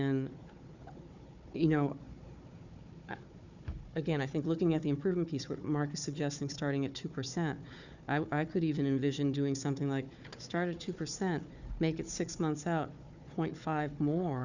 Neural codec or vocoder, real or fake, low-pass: codec, 16 kHz, 4 kbps, FunCodec, trained on Chinese and English, 50 frames a second; fake; 7.2 kHz